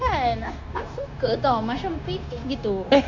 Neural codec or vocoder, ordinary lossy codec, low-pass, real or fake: codec, 16 kHz, 0.9 kbps, LongCat-Audio-Codec; MP3, 64 kbps; 7.2 kHz; fake